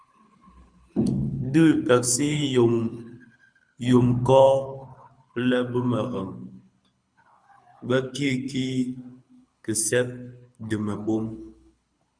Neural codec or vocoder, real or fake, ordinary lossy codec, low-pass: codec, 24 kHz, 6 kbps, HILCodec; fake; Opus, 64 kbps; 9.9 kHz